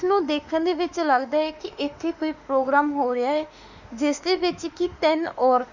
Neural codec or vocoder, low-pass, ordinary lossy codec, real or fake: autoencoder, 48 kHz, 32 numbers a frame, DAC-VAE, trained on Japanese speech; 7.2 kHz; none; fake